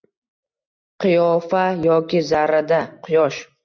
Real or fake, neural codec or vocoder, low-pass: real; none; 7.2 kHz